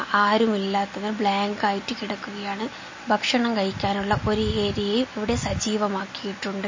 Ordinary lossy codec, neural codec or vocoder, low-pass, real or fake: MP3, 32 kbps; none; 7.2 kHz; real